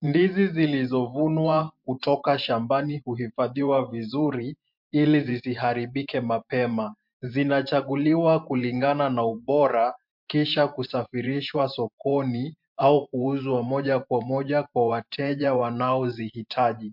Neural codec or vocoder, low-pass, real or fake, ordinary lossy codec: vocoder, 44.1 kHz, 128 mel bands every 512 samples, BigVGAN v2; 5.4 kHz; fake; MP3, 48 kbps